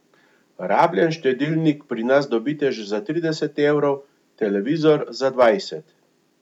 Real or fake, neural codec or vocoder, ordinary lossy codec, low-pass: real; none; none; 19.8 kHz